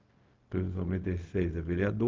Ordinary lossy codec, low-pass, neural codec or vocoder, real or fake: Opus, 32 kbps; 7.2 kHz; codec, 16 kHz, 0.4 kbps, LongCat-Audio-Codec; fake